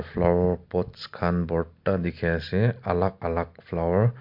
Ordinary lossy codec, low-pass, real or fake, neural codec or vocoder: MP3, 48 kbps; 5.4 kHz; real; none